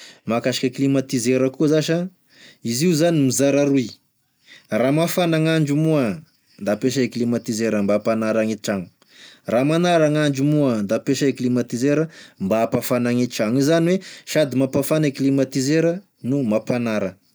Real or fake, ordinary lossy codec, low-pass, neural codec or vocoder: real; none; none; none